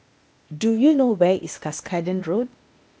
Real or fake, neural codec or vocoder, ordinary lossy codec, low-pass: fake; codec, 16 kHz, 0.8 kbps, ZipCodec; none; none